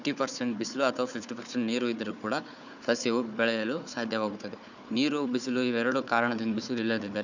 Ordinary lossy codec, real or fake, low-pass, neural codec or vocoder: none; fake; 7.2 kHz; codec, 16 kHz, 4 kbps, FunCodec, trained on Chinese and English, 50 frames a second